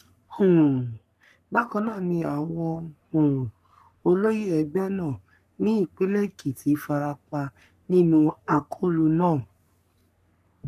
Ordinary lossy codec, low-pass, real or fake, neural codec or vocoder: none; 14.4 kHz; fake; codec, 44.1 kHz, 3.4 kbps, Pupu-Codec